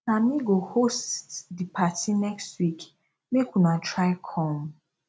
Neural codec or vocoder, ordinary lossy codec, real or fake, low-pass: none; none; real; none